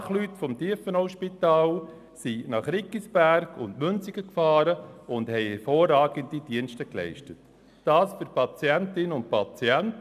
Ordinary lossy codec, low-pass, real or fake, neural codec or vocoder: none; 14.4 kHz; real; none